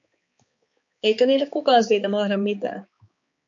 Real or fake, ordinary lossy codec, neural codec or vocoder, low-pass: fake; MP3, 48 kbps; codec, 16 kHz, 4 kbps, X-Codec, HuBERT features, trained on balanced general audio; 7.2 kHz